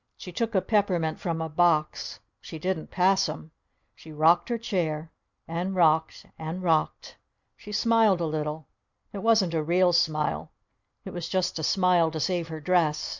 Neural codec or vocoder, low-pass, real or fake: none; 7.2 kHz; real